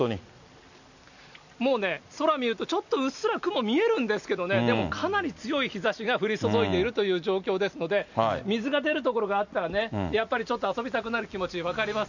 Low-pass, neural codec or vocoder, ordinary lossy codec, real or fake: 7.2 kHz; none; none; real